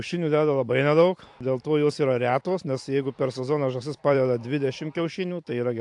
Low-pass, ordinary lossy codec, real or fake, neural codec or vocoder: 10.8 kHz; AAC, 64 kbps; fake; vocoder, 44.1 kHz, 128 mel bands every 512 samples, BigVGAN v2